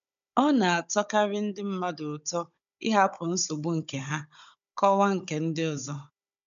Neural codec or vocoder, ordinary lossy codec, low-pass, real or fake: codec, 16 kHz, 16 kbps, FunCodec, trained on Chinese and English, 50 frames a second; none; 7.2 kHz; fake